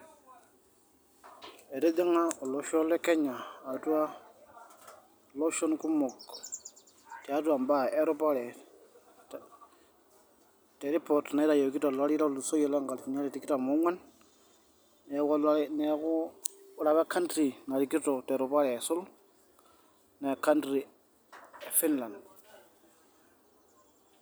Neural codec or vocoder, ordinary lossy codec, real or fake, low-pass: none; none; real; none